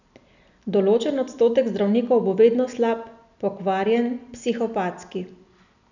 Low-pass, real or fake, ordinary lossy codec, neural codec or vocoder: 7.2 kHz; real; none; none